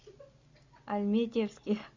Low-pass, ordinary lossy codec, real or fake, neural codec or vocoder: 7.2 kHz; Opus, 64 kbps; real; none